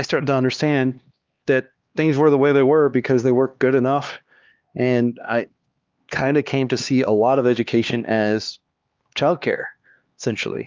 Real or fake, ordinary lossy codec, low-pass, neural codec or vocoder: fake; Opus, 32 kbps; 7.2 kHz; codec, 16 kHz, 2 kbps, X-Codec, HuBERT features, trained on LibriSpeech